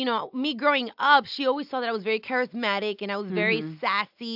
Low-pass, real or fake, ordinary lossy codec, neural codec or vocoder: 5.4 kHz; real; AAC, 48 kbps; none